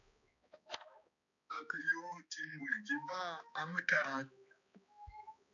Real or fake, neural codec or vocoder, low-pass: fake; codec, 16 kHz, 2 kbps, X-Codec, HuBERT features, trained on balanced general audio; 7.2 kHz